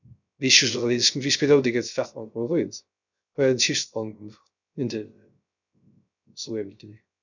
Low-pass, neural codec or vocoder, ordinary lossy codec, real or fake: 7.2 kHz; codec, 16 kHz, 0.3 kbps, FocalCodec; none; fake